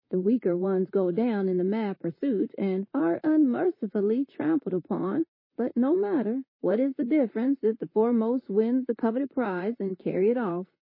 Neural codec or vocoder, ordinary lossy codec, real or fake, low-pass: vocoder, 44.1 kHz, 128 mel bands, Pupu-Vocoder; MP3, 24 kbps; fake; 5.4 kHz